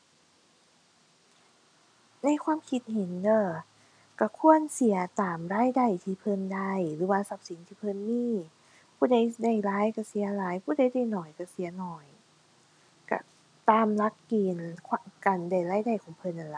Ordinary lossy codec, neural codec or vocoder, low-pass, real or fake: none; none; 9.9 kHz; real